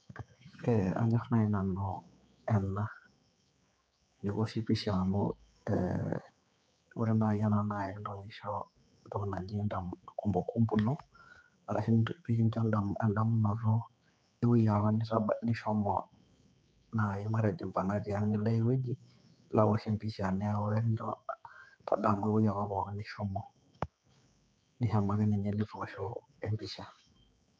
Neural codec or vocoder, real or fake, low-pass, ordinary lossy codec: codec, 16 kHz, 4 kbps, X-Codec, HuBERT features, trained on general audio; fake; none; none